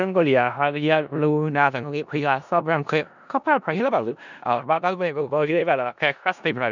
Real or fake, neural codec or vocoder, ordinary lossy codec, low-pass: fake; codec, 16 kHz in and 24 kHz out, 0.4 kbps, LongCat-Audio-Codec, four codebook decoder; none; 7.2 kHz